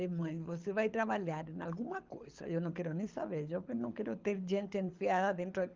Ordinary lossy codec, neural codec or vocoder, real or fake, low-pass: Opus, 32 kbps; codec, 24 kHz, 6 kbps, HILCodec; fake; 7.2 kHz